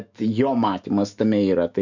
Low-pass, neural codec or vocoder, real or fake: 7.2 kHz; autoencoder, 48 kHz, 128 numbers a frame, DAC-VAE, trained on Japanese speech; fake